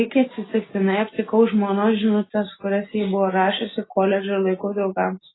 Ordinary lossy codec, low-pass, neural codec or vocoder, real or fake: AAC, 16 kbps; 7.2 kHz; none; real